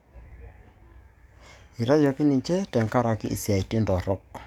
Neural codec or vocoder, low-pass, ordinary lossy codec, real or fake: codec, 44.1 kHz, 7.8 kbps, DAC; 19.8 kHz; none; fake